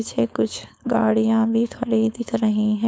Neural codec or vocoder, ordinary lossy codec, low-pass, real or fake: codec, 16 kHz, 4.8 kbps, FACodec; none; none; fake